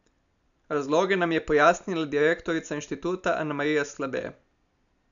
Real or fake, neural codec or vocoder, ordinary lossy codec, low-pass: real; none; none; 7.2 kHz